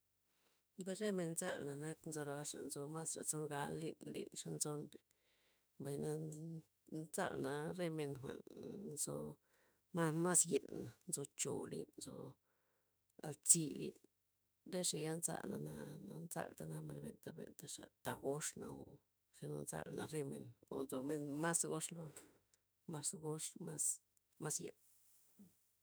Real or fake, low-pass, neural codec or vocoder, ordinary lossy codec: fake; none; autoencoder, 48 kHz, 32 numbers a frame, DAC-VAE, trained on Japanese speech; none